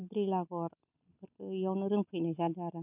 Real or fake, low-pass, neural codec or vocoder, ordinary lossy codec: real; 3.6 kHz; none; none